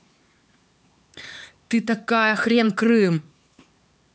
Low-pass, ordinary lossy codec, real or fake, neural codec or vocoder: none; none; fake; codec, 16 kHz, 4 kbps, X-Codec, HuBERT features, trained on LibriSpeech